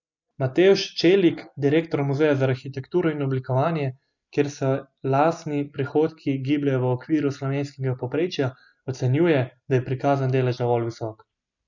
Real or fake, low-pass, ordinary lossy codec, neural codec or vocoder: real; 7.2 kHz; none; none